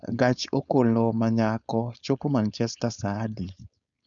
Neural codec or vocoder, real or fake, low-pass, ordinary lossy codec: codec, 16 kHz, 4.8 kbps, FACodec; fake; 7.2 kHz; none